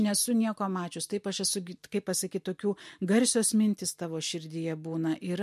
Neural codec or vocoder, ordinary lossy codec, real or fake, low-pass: none; MP3, 64 kbps; real; 14.4 kHz